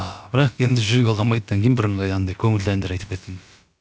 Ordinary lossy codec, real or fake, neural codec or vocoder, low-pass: none; fake; codec, 16 kHz, about 1 kbps, DyCAST, with the encoder's durations; none